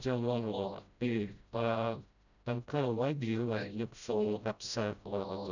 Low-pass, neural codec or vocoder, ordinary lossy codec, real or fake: 7.2 kHz; codec, 16 kHz, 0.5 kbps, FreqCodec, smaller model; none; fake